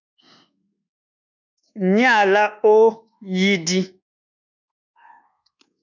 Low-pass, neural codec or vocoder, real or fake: 7.2 kHz; codec, 24 kHz, 1.2 kbps, DualCodec; fake